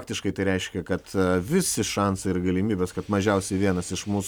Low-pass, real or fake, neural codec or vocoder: 19.8 kHz; real; none